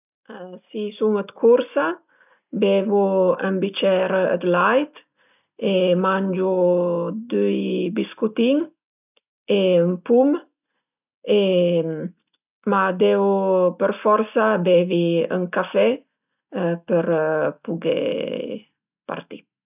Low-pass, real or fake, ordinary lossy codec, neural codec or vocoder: 3.6 kHz; real; none; none